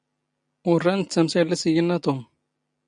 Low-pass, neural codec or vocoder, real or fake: 9.9 kHz; none; real